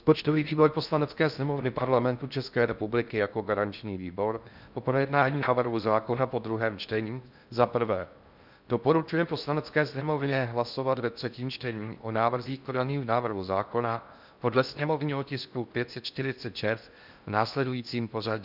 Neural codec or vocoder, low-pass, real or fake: codec, 16 kHz in and 24 kHz out, 0.6 kbps, FocalCodec, streaming, 4096 codes; 5.4 kHz; fake